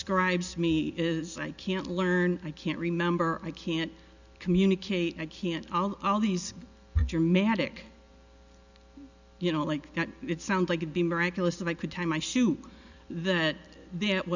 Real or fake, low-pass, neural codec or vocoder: real; 7.2 kHz; none